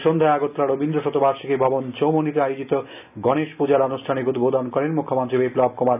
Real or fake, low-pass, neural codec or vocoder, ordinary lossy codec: real; 3.6 kHz; none; none